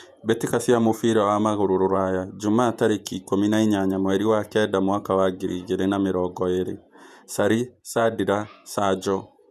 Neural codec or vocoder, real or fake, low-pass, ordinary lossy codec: vocoder, 48 kHz, 128 mel bands, Vocos; fake; 14.4 kHz; none